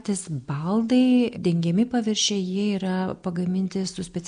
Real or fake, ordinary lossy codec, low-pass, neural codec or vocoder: real; MP3, 96 kbps; 9.9 kHz; none